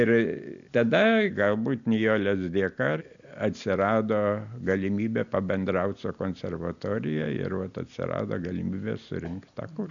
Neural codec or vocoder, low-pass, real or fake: none; 7.2 kHz; real